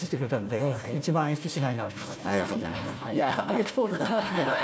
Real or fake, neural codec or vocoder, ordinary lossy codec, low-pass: fake; codec, 16 kHz, 1 kbps, FunCodec, trained on Chinese and English, 50 frames a second; none; none